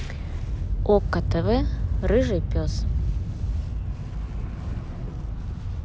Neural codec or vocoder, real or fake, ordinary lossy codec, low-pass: none; real; none; none